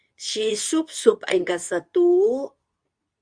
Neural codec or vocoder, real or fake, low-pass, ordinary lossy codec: codec, 24 kHz, 0.9 kbps, WavTokenizer, medium speech release version 2; fake; 9.9 kHz; Opus, 64 kbps